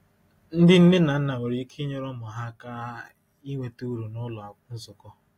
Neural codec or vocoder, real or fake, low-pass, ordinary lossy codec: none; real; 19.8 kHz; AAC, 48 kbps